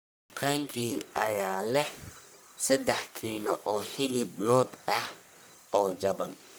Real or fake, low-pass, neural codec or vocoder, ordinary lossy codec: fake; none; codec, 44.1 kHz, 1.7 kbps, Pupu-Codec; none